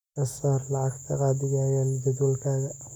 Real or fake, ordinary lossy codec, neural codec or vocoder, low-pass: real; none; none; 19.8 kHz